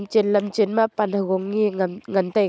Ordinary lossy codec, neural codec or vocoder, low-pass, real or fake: none; none; none; real